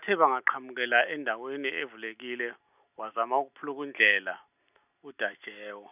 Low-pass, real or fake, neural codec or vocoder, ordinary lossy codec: 3.6 kHz; real; none; none